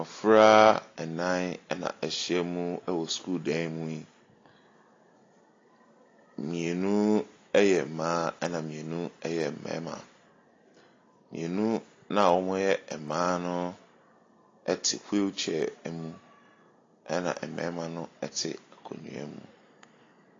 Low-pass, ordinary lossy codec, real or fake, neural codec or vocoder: 7.2 kHz; AAC, 32 kbps; real; none